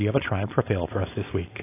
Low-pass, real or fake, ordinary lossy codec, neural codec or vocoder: 3.6 kHz; fake; AAC, 16 kbps; codec, 16 kHz, 4.8 kbps, FACodec